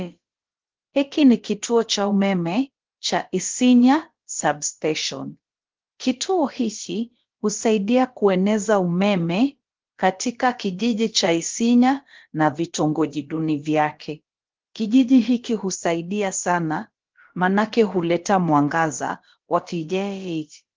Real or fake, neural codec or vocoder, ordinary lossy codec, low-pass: fake; codec, 16 kHz, about 1 kbps, DyCAST, with the encoder's durations; Opus, 16 kbps; 7.2 kHz